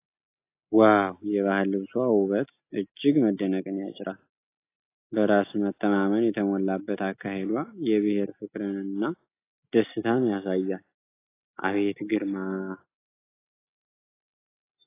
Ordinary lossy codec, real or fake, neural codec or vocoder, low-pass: AAC, 24 kbps; real; none; 3.6 kHz